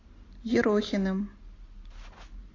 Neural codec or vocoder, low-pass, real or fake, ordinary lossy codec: none; 7.2 kHz; real; AAC, 32 kbps